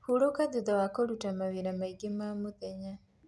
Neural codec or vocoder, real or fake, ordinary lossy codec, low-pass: none; real; none; none